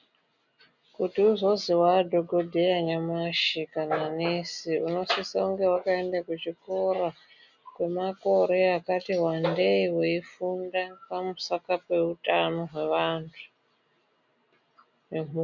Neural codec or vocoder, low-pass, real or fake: none; 7.2 kHz; real